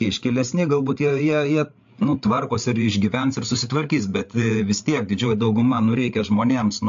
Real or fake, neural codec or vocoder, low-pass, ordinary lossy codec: fake; codec, 16 kHz, 8 kbps, FreqCodec, larger model; 7.2 kHz; AAC, 64 kbps